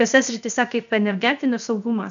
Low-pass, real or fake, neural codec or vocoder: 7.2 kHz; fake; codec, 16 kHz, 0.7 kbps, FocalCodec